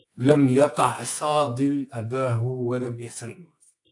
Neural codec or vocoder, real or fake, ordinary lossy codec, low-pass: codec, 24 kHz, 0.9 kbps, WavTokenizer, medium music audio release; fake; AAC, 64 kbps; 10.8 kHz